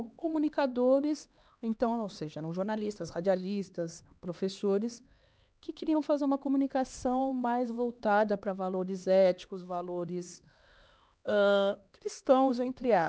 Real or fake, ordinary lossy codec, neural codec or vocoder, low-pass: fake; none; codec, 16 kHz, 2 kbps, X-Codec, HuBERT features, trained on LibriSpeech; none